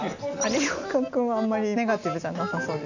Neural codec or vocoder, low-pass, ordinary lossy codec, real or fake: none; 7.2 kHz; none; real